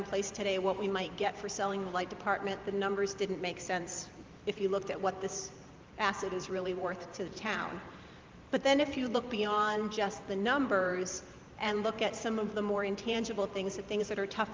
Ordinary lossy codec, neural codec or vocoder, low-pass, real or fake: Opus, 32 kbps; vocoder, 44.1 kHz, 128 mel bands every 512 samples, BigVGAN v2; 7.2 kHz; fake